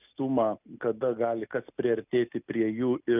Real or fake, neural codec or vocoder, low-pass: real; none; 3.6 kHz